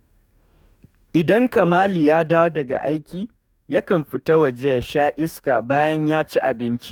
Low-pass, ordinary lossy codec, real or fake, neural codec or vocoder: 19.8 kHz; none; fake; codec, 44.1 kHz, 2.6 kbps, DAC